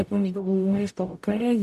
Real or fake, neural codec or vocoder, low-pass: fake; codec, 44.1 kHz, 0.9 kbps, DAC; 14.4 kHz